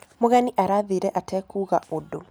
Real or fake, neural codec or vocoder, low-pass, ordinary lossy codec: real; none; none; none